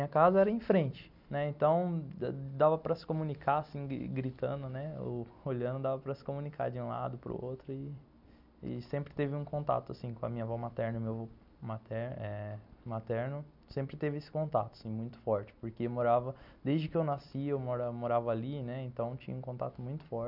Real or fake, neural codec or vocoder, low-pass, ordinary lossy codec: real; none; 5.4 kHz; none